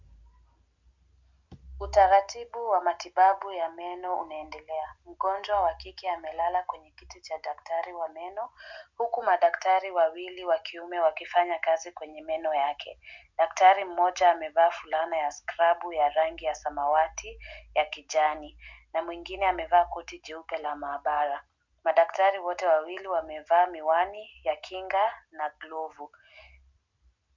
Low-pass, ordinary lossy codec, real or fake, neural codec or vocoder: 7.2 kHz; MP3, 64 kbps; real; none